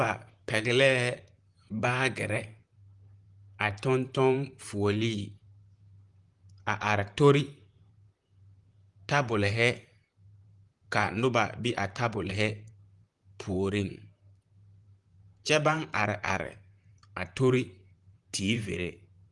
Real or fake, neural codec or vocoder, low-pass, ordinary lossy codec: fake; vocoder, 44.1 kHz, 128 mel bands, Pupu-Vocoder; 10.8 kHz; Opus, 24 kbps